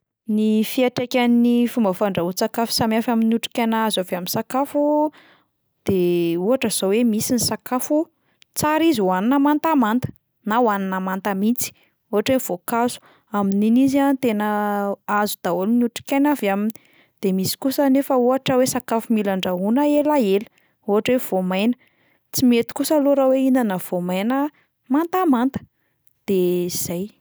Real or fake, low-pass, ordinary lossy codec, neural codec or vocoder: real; none; none; none